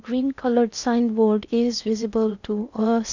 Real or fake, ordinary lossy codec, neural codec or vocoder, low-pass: fake; none; codec, 16 kHz in and 24 kHz out, 0.6 kbps, FocalCodec, streaming, 4096 codes; 7.2 kHz